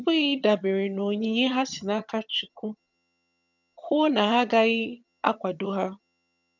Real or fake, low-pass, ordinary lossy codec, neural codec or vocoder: fake; 7.2 kHz; none; vocoder, 22.05 kHz, 80 mel bands, HiFi-GAN